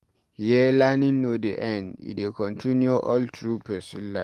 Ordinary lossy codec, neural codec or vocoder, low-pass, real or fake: Opus, 24 kbps; codec, 44.1 kHz, 7.8 kbps, DAC; 14.4 kHz; fake